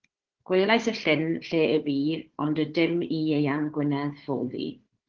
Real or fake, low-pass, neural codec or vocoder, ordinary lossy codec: fake; 7.2 kHz; codec, 16 kHz, 4 kbps, FunCodec, trained on Chinese and English, 50 frames a second; Opus, 16 kbps